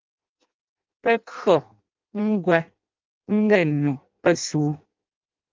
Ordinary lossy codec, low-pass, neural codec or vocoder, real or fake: Opus, 32 kbps; 7.2 kHz; codec, 16 kHz in and 24 kHz out, 0.6 kbps, FireRedTTS-2 codec; fake